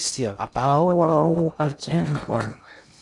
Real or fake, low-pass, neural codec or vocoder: fake; 10.8 kHz; codec, 16 kHz in and 24 kHz out, 0.6 kbps, FocalCodec, streaming, 2048 codes